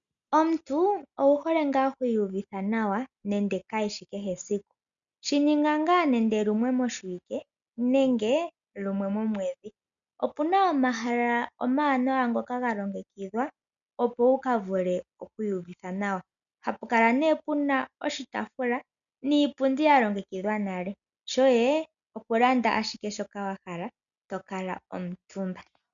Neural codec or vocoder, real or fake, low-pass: none; real; 7.2 kHz